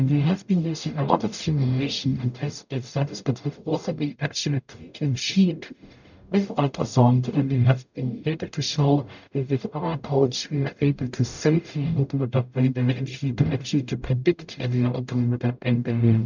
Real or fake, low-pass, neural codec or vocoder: fake; 7.2 kHz; codec, 44.1 kHz, 0.9 kbps, DAC